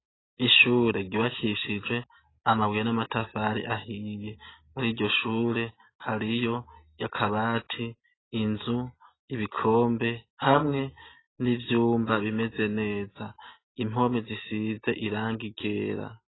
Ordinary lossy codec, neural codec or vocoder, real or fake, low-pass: AAC, 16 kbps; none; real; 7.2 kHz